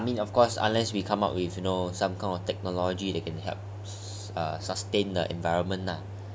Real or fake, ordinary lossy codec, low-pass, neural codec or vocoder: real; none; none; none